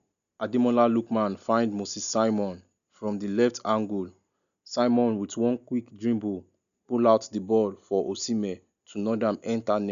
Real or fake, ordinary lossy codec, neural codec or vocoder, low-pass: real; none; none; 7.2 kHz